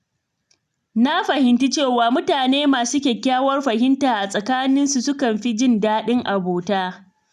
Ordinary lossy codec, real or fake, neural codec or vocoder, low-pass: none; real; none; 14.4 kHz